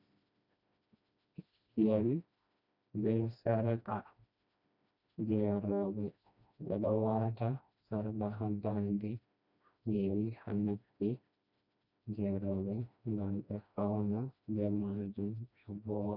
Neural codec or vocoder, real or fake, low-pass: codec, 16 kHz, 1 kbps, FreqCodec, smaller model; fake; 5.4 kHz